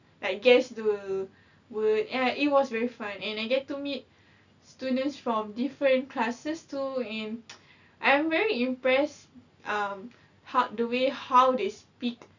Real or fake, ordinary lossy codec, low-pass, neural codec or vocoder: real; none; 7.2 kHz; none